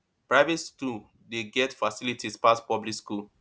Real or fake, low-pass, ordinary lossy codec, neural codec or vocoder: real; none; none; none